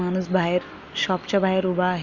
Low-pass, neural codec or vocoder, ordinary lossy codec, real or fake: 7.2 kHz; none; none; real